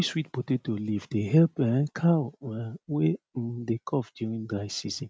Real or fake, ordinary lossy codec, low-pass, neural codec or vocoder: real; none; none; none